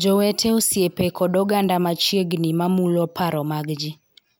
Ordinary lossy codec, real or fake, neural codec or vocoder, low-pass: none; real; none; none